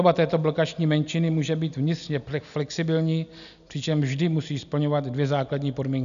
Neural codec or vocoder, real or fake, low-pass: none; real; 7.2 kHz